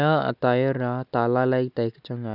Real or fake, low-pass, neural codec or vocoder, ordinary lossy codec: real; 5.4 kHz; none; none